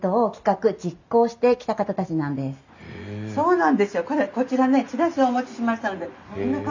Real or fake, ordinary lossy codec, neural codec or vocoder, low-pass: real; none; none; 7.2 kHz